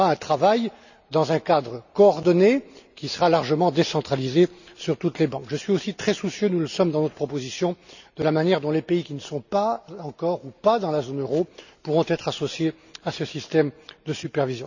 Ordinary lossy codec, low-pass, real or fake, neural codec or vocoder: none; 7.2 kHz; real; none